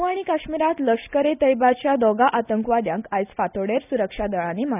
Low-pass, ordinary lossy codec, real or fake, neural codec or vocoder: 3.6 kHz; none; real; none